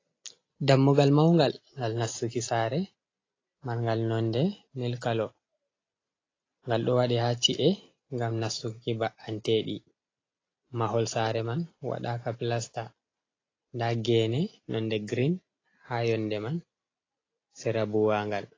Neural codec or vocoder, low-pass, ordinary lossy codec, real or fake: none; 7.2 kHz; AAC, 32 kbps; real